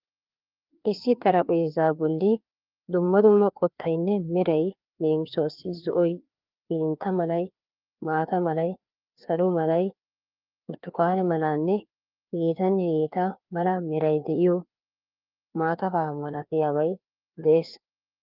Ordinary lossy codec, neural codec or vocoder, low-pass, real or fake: Opus, 32 kbps; codec, 16 kHz, 2 kbps, FreqCodec, larger model; 5.4 kHz; fake